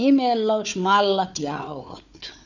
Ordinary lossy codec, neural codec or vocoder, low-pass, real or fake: none; codec, 16 kHz, 4 kbps, FunCodec, trained on Chinese and English, 50 frames a second; 7.2 kHz; fake